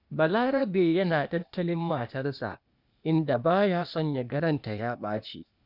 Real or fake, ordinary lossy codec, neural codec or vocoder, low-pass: fake; none; codec, 16 kHz, 0.8 kbps, ZipCodec; 5.4 kHz